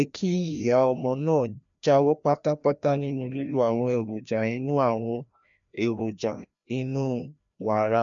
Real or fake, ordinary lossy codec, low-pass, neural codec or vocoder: fake; none; 7.2 kHz; codec, 16 kHz, 1 kbps, FreqCodec, larger model